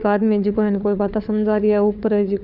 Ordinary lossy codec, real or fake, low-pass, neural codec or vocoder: none; fake; 5.4 kHz; codec, 16 kHz, 4 kbps, FunCodec, trained on LibriTTS, 50 frames a second